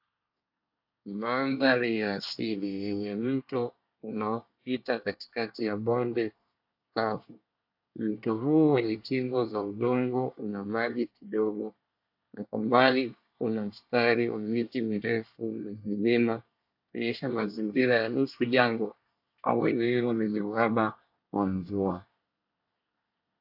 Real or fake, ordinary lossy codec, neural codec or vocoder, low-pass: fake; AAC, 48 kbps; codec, 24 kHz, 1 kbps, SNAC; 5.4 kHz